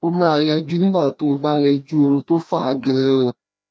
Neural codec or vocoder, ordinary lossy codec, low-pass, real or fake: codec, 16 kHz, 1 kbps, FreqCodec, larger model; none; none; fake